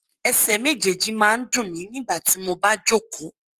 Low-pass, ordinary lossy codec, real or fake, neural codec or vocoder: 14.4 kHz; Opus, 24 kbps; fake; codec, 44.1 kHz, 7.8 kbps, Pupu-Codec